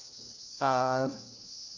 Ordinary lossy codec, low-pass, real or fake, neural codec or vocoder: none; 7.2 kHz; fake; codec, 16 kHz, 1 kbps, FunCodec, trained on LibriTTS, 50 frames a second